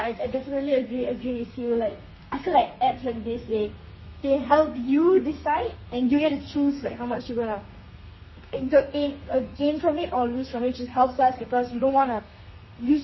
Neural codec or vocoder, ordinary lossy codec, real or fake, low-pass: codec, 32 kHz, 1.9 kbps, SNAC; MP3, 24 kbps; fake; 7.2 kHz